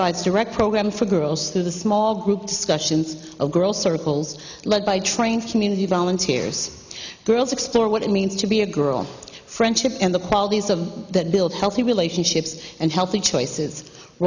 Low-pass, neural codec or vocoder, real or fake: 7.2 kHz; none; real